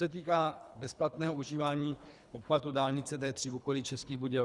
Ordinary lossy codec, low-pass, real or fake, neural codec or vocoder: Opus, 64 kbps; 10.8 kHz; fake; codec, 24 kHz, 3 kbps, HILCodec